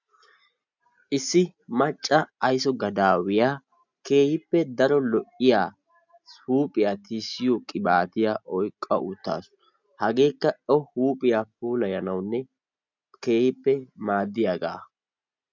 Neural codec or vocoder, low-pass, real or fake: none; 7.2 kHz; real